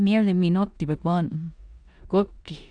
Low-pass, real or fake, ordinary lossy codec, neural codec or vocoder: 9.9 kHz; fake; AAC, 64 kbps; codec, 16 kHz in and 24 kHz out, 0.9 kbps, LongCat-Audio-Codec, four codebook decoder